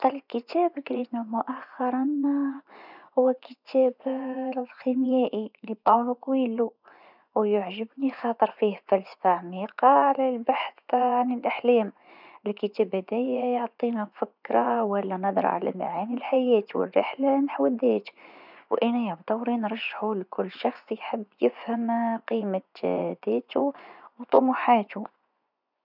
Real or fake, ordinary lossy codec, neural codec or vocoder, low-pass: fake; none; vocoder, 24 kHz, 100 mel bands, Vocos; 5.4 kHz